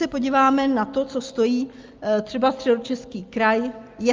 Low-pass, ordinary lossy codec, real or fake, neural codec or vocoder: 7.2 kHz; Opus, 24 kbps; real; none